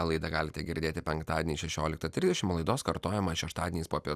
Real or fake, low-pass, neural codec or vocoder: real; 14.4 kHz; none